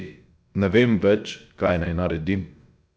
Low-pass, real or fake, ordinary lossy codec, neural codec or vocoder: none; fake; none; codec, 16 kHz, about 1 kbps, DyCAST, with the encoder's durations